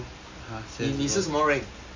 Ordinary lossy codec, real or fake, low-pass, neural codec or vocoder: MP3, 48 kbps; real; 7.2 kHz; none